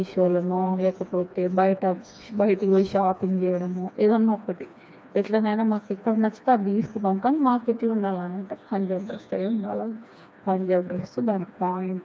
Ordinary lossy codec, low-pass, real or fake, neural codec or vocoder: none; none; fake; codec, 16 kHz, 2 kbps, FreqCodec, smaller model